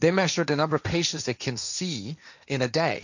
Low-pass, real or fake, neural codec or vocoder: 7.2 kHz; fake; codec, 16 kHz, 1.1 kbps, Voila-Tokenizer